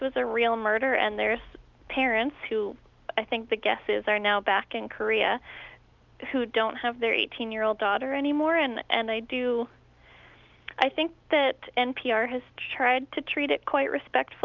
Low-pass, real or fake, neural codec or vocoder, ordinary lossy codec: 7.2 kHz; real; none; Opus, 24 kbps